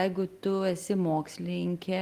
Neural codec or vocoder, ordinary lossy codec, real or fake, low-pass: none; Opus, 24 kbps; real; 14.4 kHz